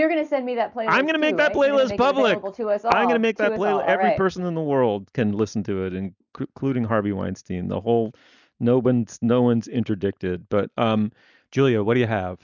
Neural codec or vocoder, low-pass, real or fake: none; 7.2 kHz; real